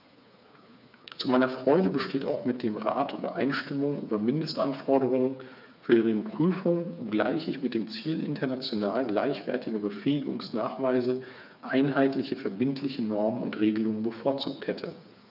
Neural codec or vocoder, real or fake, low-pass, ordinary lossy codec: codec, 16 kHz, 4 kbps, FreqCodec, smaller model; fake; 5.4 kHz; none